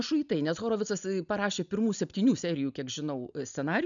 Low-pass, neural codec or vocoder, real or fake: 7.2 kHz; none; real